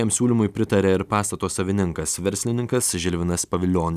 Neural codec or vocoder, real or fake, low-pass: none; real; 14.4 kHz